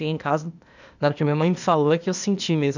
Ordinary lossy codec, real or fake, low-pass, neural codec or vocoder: none; fake; 7.2 kHz; codec, 16 kHz, 0.8 kbps, ZipCodec